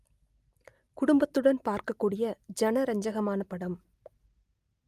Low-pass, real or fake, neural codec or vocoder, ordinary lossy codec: 14.4 kHz; real; none; Opus, 32 kbps